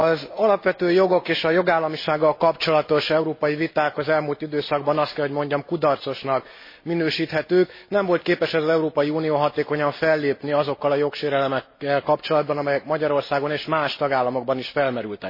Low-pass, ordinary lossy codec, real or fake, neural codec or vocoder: 5.4 kHz; MP3, 24 kbps; real; none